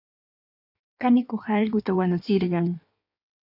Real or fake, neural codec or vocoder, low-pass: fake; codec, 16 kHz in and 24 kHz out, 1.1 kbps, FireRedTTS-2 codec; 5.4 kHz